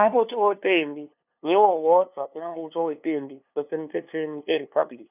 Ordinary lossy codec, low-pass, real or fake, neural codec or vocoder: none; 3.6 kHz; fake; codec, 16 kHz, 2 kbps, FunCodec, trained on LibriTTS, 25 frames a second